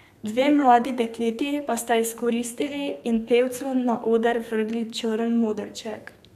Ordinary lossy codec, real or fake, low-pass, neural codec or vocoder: none; fake; 14.4 kHz; codec, 32 kHz, 1.9 kbps, SNAC